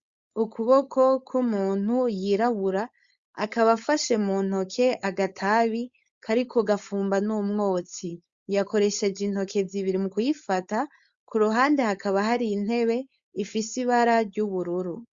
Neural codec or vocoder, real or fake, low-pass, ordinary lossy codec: codec, 16 kHz, 4.8 kbps, FACodec; fake; 7.2 kHz; Opus, 64 kbps